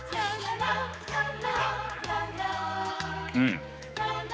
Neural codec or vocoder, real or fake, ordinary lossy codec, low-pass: codec, 16 kHz, 4 kbps, X-Codec, HuBERT features, trained on general audio; fake; none; none